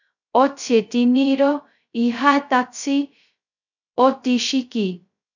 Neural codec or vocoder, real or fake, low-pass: codec, 16 kHz, 0.2 kbps, FocalCodec; fake; 7.2 kHz